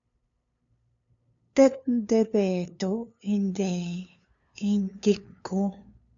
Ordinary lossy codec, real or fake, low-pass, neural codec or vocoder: MP3, 96 kbps; fake; 7.2 kHz; codec, 16 kHz, 2 kbps, FunCodec, trained on LibriTTS, 25 frames a second